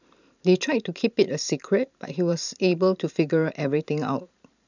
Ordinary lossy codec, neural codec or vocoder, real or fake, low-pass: none; vocoder, 44.1 kHz, 128 mel bands every 512 samples, BigVGAN v2; fake; 7.2 kHz